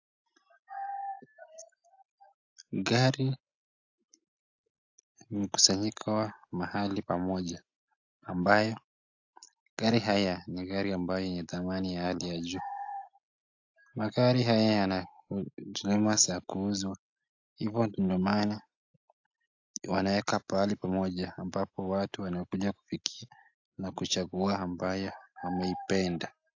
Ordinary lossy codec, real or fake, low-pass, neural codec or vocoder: AAC, 48 kbps; real; 7.2 kHz; none